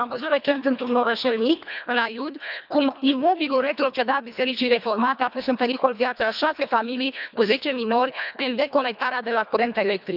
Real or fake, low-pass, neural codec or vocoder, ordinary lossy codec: fake; 5.4 kHz; codec, 24 kHz, 1.5 kbps, HILCodec; none